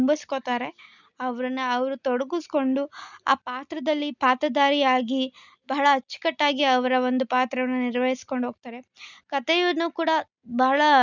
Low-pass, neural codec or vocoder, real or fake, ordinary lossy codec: 7.2 kHz; none; real; none